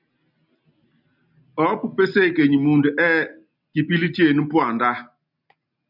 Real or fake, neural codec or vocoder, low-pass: real; none; 5.4 kHz